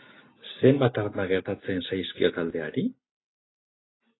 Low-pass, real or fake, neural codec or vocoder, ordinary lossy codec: 7.2 kHz; real; none; AAC, 16 kbps